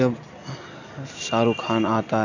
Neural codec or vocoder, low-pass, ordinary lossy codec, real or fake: none; 7.2 kHz; none; real